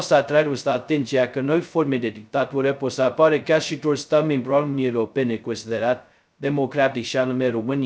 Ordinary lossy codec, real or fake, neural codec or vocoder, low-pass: none; fake; codec, 16 kHz, 0.2 kbps, FocalCodec; none